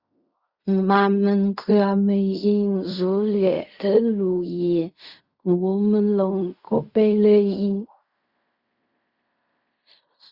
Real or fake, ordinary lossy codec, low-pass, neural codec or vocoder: fake; Opus, 64 kbps; 5.4 kHz; codec, 16 kHz in and 24 kHz out, 0.4 kbps, LongCat-Audio-Codec, fine tuned four codebook decoder